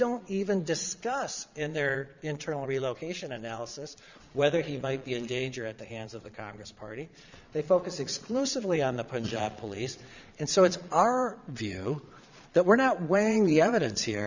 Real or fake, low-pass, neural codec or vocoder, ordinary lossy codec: fake; 7.2 kHz; vocoder, 22.05 kHz, 80 mel bands, Vocos; Opus, 64 kbps